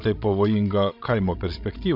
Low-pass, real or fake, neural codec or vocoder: 5.4 kHz; fake; codec, 16 kHz, 16 kbps, FreqCodec, larger model